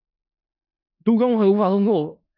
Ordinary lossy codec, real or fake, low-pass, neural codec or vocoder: AAC, 48 kbps; fake; 5.4 kHz; codec, 16 kHz in and 24 kHz out, 0.4 kbps, LongCat-Audio-Codec, four codebook decoder